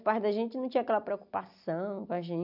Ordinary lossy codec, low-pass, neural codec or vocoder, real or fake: none; 5.4 kHz; none; real